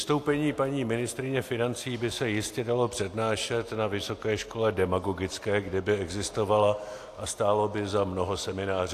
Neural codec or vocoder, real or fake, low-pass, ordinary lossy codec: none; real; 14.4 kHz; AAC, 64 kbps